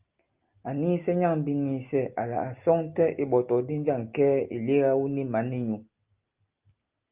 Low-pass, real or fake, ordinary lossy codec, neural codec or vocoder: 3.6 kHz; real; Opus, 32 kbps; none